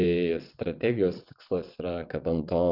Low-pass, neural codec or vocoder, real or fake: 5.4 kHz; vocoder, 22.05 kHz, 80 mel bands, WaveNeXt; fake